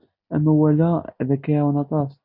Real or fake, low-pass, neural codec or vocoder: real; 5.4 kHz; none